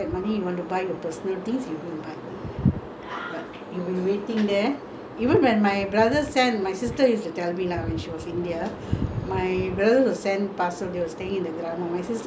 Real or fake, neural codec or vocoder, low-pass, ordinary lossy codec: real; none; none; none